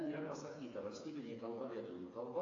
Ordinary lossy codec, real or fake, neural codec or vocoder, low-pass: AAC, 32 kbps; fake; codec, 16 kHz, 4 kbps, FreqCodec, smaller model; 7.2 kHz